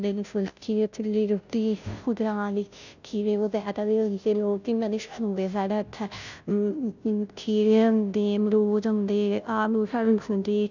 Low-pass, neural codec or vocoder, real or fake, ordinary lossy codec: 7.2 kHz; codec, 16 kHz, 0.5 kbps, FunCodec, trained on Chinese and English, 25 frames a second; fake; none